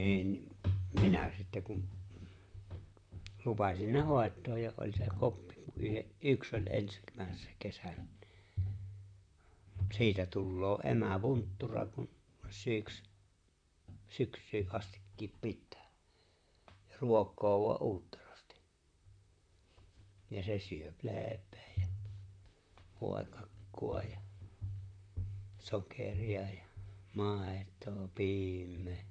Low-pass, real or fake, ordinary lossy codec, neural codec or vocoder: 9.9 kHz; fake; none; vocoder, 44.1 kHz, 128 mel bands, Pupu-Vocoder